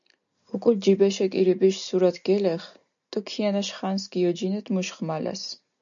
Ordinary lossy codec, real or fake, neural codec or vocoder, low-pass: MP3, 96 kbps; real; none; 7.2 kHz